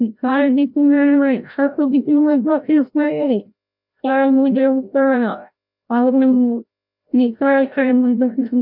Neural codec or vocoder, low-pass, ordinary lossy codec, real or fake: codec, 16 kHz, 0.5 kbps, FreqCodec, larger model; 5.4 kHz; none; fake